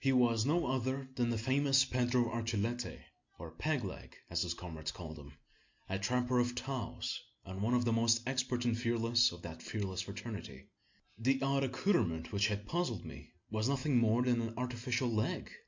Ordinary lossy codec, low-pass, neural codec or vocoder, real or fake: MP3, 48 kbps; 7.2 kHz; none; real